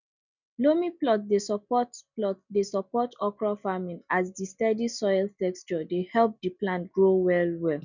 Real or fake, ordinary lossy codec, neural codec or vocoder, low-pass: real; none; none; 7.2 kHz